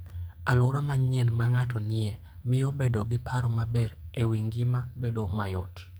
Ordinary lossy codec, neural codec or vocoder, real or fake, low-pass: none; codec, 44.1 kHz, 2.6 kbps, SNAC; fake; none